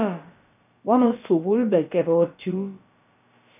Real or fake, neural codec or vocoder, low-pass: fake; codec, 16 kHz, about 1 kbps, DyCAST, with the encoder's durations; 3.6 kHz